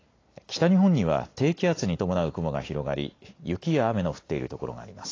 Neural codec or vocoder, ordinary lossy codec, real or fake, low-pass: none; AAC, 32 kbps; real; 7.2 kHz